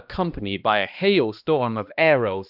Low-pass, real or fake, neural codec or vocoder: 5.4 kHz; fake; codec, 16 kHz, 1 kbps, X-Codec, HuBERT features, trained on balanced general audio